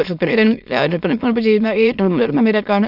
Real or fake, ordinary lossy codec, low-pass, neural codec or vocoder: fake; none; 5.4 kHz; autoencoder, 22.05 kHz, a latent of 192 numbers a frame, VITS, trained on many speakers